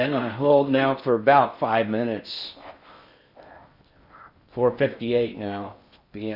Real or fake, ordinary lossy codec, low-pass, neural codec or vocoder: fake; Opus, 64 kbps; 5.4 kHz; codec, 16 kHz in and 24 kHz out, 0.6 kbps, FocalCodec, streaming, 4096 codes